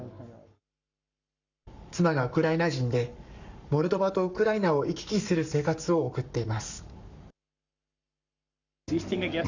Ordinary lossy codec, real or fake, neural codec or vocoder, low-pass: none; fake; codec, 44.1 kHz, 7.8 kbps, Pupu-Codec; 7.2 kHz